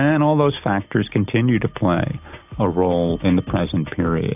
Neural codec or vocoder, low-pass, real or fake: none; 3.6 kHz; real